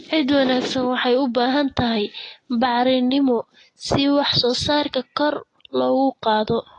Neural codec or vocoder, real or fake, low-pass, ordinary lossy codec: none; real; 10.8 kHz; AAC, 32 kbps